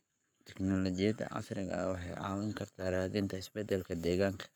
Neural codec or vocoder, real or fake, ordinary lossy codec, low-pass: codec, 44.1 kHz, 7.8 kbps, Pupu-Codec; fake; none; none